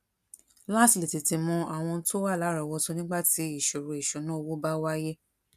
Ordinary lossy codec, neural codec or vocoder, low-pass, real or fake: none; none; 14.4 kHz; real